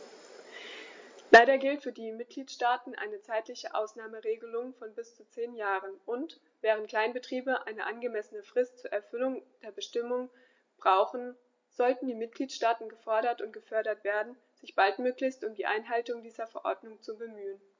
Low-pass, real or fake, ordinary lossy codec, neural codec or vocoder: 7.2 kHz; real; MP3, 48 kbps; none